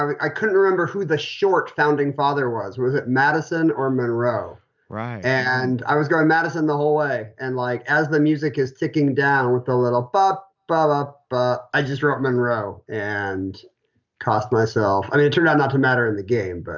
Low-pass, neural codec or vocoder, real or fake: 7.2 kHz; none; real